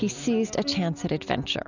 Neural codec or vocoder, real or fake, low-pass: none; real; 7.2 kHz